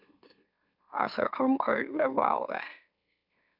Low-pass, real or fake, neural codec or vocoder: 5.4 kHz; fake; autoencoder, 44.1 kHz, a latent of 192 numbers a frame, MeloTTS